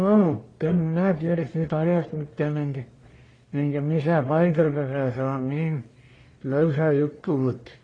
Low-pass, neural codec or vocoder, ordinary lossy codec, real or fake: 9.9 kHz; codec, 44.1 kHz, 1.7 kbps, Pupu-Codec; AAC, 32 kbps; fake